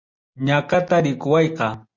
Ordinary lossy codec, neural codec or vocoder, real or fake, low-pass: Opus, 64 kbps; none; real; 7.2 kHz